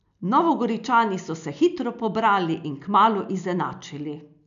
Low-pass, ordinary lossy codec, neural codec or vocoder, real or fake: 7.2 kHz; none; none; real